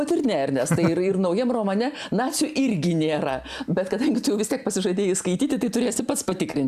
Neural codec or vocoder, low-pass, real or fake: none; 14.4 kHz; real